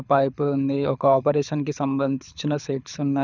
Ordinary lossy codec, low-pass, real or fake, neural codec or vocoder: none; 7.2 kHz; fake; codec, 24 kHz, 6 kbps, HILCodec